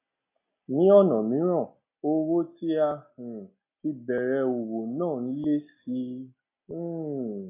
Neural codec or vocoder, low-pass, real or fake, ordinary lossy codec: none; 3.6 kHz; real; AAC, 32 kbps